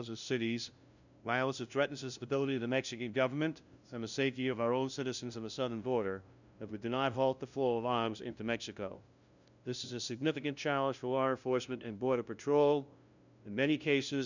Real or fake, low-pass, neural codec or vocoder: fake; 7.2 kHz; codec, 16 kHz, 0.5 kbps, FunCodec, trained on LibriTTS, 25 frames a second